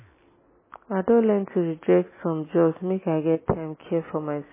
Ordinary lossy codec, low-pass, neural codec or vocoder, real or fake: MP3, 16 kbps; 3.6 kHz; none; real